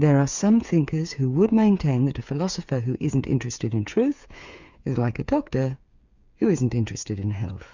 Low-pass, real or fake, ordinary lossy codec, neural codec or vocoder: 7.2 kHz; fake; Opus, 64 kbps; codec, 16 kHz, 8 kbps, FreqCodec, smaller model